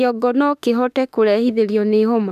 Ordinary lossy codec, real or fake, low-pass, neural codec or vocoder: none; fake; 14.4 kHz; autoencoder, 48 kHz, 32 numbers a frame, DAC-VAE, trained on Japanese speech